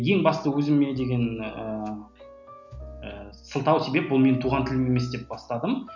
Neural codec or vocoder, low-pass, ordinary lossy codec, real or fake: none; 7.2 kHz; none; real